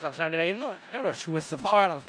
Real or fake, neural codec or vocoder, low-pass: fake; codec, 16 kHz in and 24 kHz out, 0.4 kbps, LongCat-Audio-Codec, four codebook decoder; 9.9 kHz